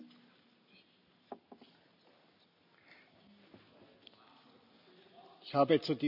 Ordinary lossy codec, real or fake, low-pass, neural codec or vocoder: none; real; 5.4 kHz; none